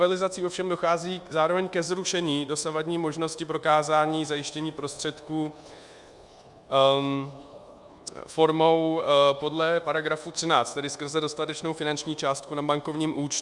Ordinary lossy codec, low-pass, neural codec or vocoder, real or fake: Opus, 64 kbps; 10.8 kHz; codec, 24 kHz, 1.2 kbps, DualCodec; fake